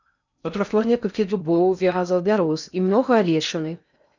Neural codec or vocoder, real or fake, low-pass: codec, 16 kHz in and 24 kHz out, 0.6 kbps, FocalCodec, streaming, 2048 codes; fake; 7.2 kHz